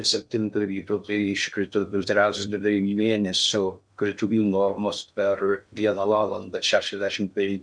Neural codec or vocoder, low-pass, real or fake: codec, 16 kHz in and 24 kHz out, 0.6 kbps, FocalCodec, streaming, 2048 codes; 9.9 kHz; fake